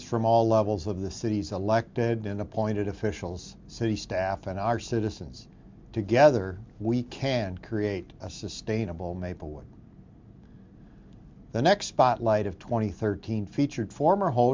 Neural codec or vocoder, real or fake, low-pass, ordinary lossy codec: none; real; 7.2 kHz; MP3, 64 kbps